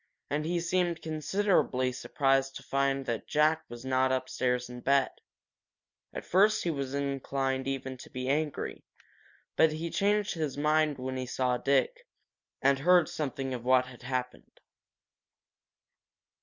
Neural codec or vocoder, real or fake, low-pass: none; real; 7.2 kHz